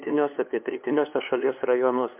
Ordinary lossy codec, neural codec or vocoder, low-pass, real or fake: MP3, 32 kbps; codec, 16 kHz, 2 kbps, FunCodec, trained on LibriTTS, 25 frames a second; 3.6 kHz; fake